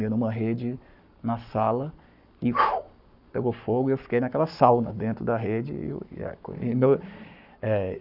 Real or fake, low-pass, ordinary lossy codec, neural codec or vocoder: fake; 5.4 kHz; none; codec, 44.1 kHz, 7.8 kbps, Pupu-Codec